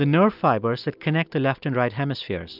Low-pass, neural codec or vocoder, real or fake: 5.4 kHz; none; real